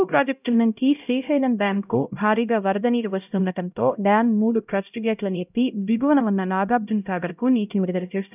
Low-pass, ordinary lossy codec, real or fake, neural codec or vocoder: 3.6 kHz; none; fake; codec, 16 kHz, 0.5 kbps, X-Codec, HuBERT features, trained on LibriSpeech